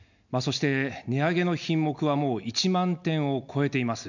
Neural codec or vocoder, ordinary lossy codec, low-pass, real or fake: none; none; 7.2 kHz; real